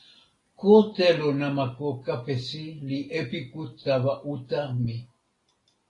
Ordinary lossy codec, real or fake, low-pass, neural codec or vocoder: AAC, 32 kbps; real; 10.8 kHz; none